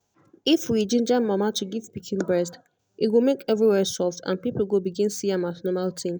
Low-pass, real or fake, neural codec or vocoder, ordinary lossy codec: none; real; none; none